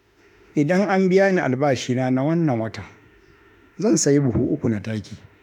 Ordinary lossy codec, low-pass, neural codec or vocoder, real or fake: none; 19.8 kHz; autoencoder, 48 kHz, 32 numbers a frame, DAC-VAE, trained on Japanese speech; fake